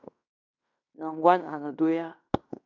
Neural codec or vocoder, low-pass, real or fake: codec, 16 kHz in and 24 kHz out, 0.9 kbps, LongCat-Audio-Codec, fine tuned four codebook decoder; 7.2 kHz; fake